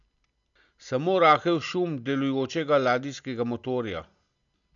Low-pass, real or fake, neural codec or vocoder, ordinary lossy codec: 7.2 kHz; real; none; none